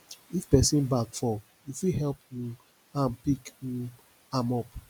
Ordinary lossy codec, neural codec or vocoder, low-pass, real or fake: none; none; none; real